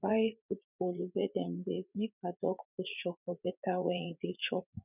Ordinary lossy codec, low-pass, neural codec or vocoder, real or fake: none; 3.6 kHz; vocoder, 44.1 kHz, 80 mel bands, Vocos; fake